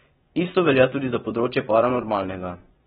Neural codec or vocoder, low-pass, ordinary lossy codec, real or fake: vocoder, 24 kHz, 100 mel bands, Vocos; 10.8 kHz; AAC, 16 kbps; fake